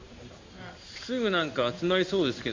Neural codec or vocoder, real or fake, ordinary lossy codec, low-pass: codec, 16 kHz in and 24 kHz out, 1 kbps, XY-Tokenizer; fake; MP3, 48 kbps; 7.2 kHz